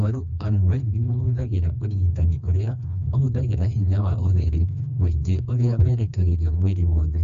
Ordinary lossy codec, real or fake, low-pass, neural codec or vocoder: AAC, 96 kbps; fake; 7.2 kHz; codec, 16 kHz, 2 kbps, FreqCodec, smaller model